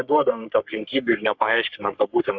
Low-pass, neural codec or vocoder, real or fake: 7.2 kHz; codec, 44.1 kHz, 3.4 kbps, Pupu-Codec; fake